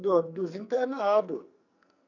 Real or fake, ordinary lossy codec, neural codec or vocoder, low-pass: fake; none; codec, 44.1 kHz, 2.6 kbps, SNAC; 7.2 kHz